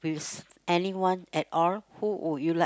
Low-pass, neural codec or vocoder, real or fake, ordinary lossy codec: none; none; real; none